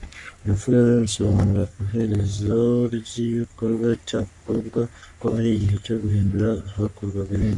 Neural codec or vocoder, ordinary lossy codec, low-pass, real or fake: codec, 44.1 kHz, 3.4 kbps, Pupu-Codec; MP3, 96 kbps; 10.8 kHz; fake